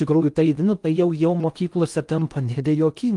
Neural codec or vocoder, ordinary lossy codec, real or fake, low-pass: codec, 16 kHz in and 24 kHz out, 0.6 kbps, FocalCodec, streaming, 4096 codes; Opus, 32 kbps; fake; 10.8 kHz